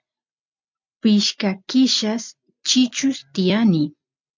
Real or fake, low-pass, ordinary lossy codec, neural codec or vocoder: real; 7.2 kHz; MP3, 64 kbps; none